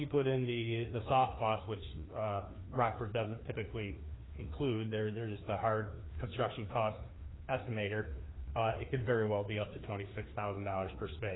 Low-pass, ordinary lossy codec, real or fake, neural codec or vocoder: 7.2 kHz; AAC, 16 kbps; fake; codec, 16 kHz, 2 kbps, FreqCodec, larger model